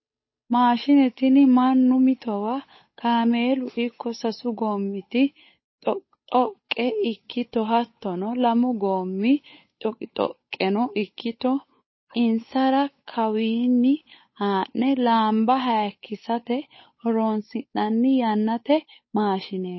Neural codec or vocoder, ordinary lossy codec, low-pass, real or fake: codec, 16 kHz, 8 kbps, FunCodec, trained on Chinese and English, 25 frames a second; MP3, 24 kbps; 7.2 kHz; fake